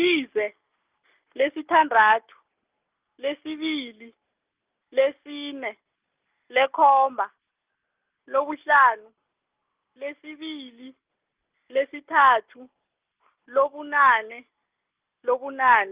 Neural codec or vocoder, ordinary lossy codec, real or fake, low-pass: none; Opus, 32 kbps; real; 3.6 kHz